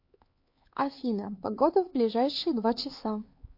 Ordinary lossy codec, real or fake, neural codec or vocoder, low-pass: MP3, 32 kbps; fake; codec, 16 kHz, 4 kbps, X-Codec, HuBERT features, trained on LibriSpeech; 5.4 kHz